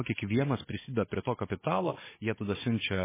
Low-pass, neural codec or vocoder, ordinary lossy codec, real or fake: 3.6 kHz; codec, 16 kHz, 16 kbps, FunCodec, trained on Chinese and English, 50 frames a second; MP3, 16 kbps; fake